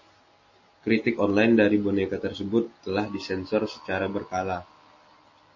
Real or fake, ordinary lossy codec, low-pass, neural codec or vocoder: real; MP3, 32 kbps; 7.2 kHz; none